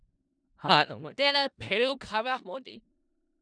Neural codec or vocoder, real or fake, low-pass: codec, 16 kHz in and 24 kHz out, 0.4 kbps, LongCat-Audio-Codec, four codebook decoder; fake; 9.9 kHz